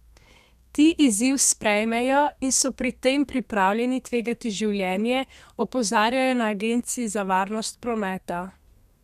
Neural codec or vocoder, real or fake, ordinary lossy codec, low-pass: codec, 32 kHz, 1.9 kbps, SNAC; fake; none; 14.4 kHz